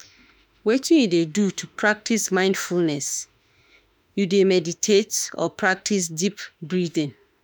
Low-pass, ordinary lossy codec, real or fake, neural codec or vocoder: none; none; fake; autoencoder, 48 kHz, 32 numbers a frame, DAC-VAE, trained on Japanese speech